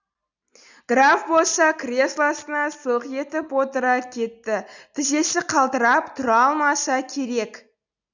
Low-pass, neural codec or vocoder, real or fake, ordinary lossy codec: 7.2 kHz; none; real; none